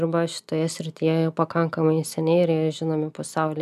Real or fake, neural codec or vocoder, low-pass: real; none; 14.4 kHz